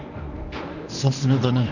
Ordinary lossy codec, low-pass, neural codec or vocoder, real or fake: none; 7.2 kHz; codec, 24 kHz, 0.9 kbps, WavTokenizer, medium speech release version 1; fake